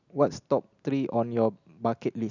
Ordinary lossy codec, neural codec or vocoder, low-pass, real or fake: none; none; 7.2 kHz; real